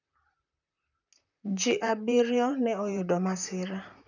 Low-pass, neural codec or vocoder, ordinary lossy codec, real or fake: 7.2 kHz; vocoder, 44.1 kHz, 128 mel bands, Pupu-Vocoder; none; fake